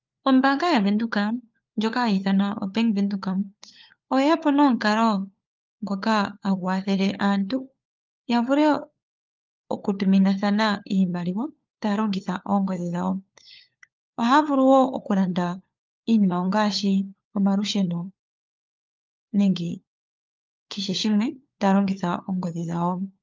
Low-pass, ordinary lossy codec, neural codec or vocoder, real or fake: 7.2 kHz; Opus, 24 kbps; codec, 16 kHz, 4 kbps, FunCodec, trained on LibriTTS, 50 frames a second; fake